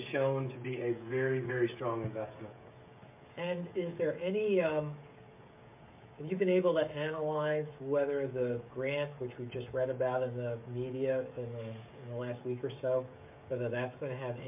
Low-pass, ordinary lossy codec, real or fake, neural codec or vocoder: 3.6 kHz; MP3, 32 kbps; fake; codec, 16 kHz, 16 kbps, FreqCodec, smaller model